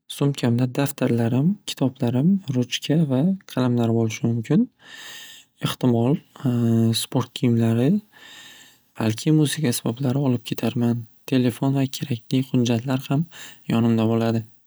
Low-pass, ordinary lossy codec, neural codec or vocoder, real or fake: none; none; none; real